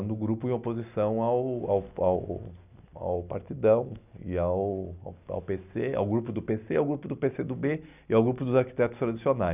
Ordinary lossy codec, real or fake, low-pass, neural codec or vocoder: none; real; 3.6 kHz; none